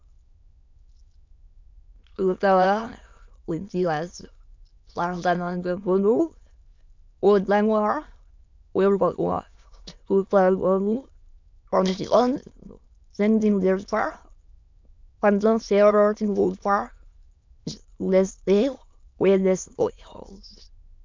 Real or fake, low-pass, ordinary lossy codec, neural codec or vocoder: fake; 7.2 kHz; MP3, 64 kbps; autoencoder, 22.05 kHz, a latent of 192 numbers a frame, VITS, trained on many speakers